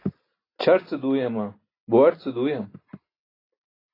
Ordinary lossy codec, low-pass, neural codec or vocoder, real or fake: AAC, 24 kbps; 5.4 kHz; none; real